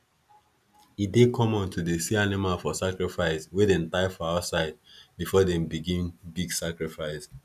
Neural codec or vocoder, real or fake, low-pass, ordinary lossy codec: none; real; 14.4 kHz; none